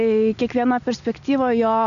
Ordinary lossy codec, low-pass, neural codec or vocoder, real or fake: MP3, 96 kbps; 7.2 kHz; none; real